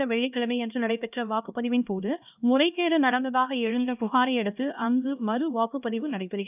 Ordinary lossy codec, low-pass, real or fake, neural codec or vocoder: none; 3.6 kHz; fake; codec, 16 kHz, 1 kbps, X-Codec, HuBERT features, trained on LibriSpeech